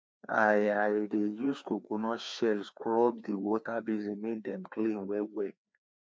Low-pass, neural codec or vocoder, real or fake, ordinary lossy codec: none; codec, 16 kHz, 2 kbps, FreqCodec, larger model; fake; none